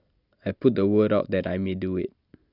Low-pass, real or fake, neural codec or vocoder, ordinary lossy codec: 5.4 kHz; real; none; none